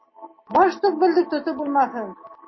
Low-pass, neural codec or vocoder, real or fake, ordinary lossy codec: 7.2 kHz; none; real; MP3, 24 kbps